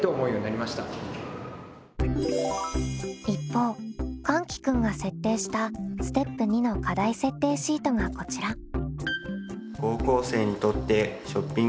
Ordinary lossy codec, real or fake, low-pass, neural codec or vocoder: none; real; none; none